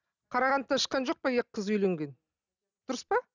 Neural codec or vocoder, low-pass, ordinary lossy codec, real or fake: none; 7.2 kHz; none; real